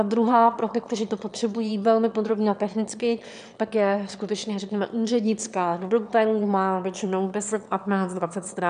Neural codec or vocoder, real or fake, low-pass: autoencoder, 22.05 kHz, a latent of 192 numbers a frame, VITS, trained on one speaker; fake; 9.9 kHz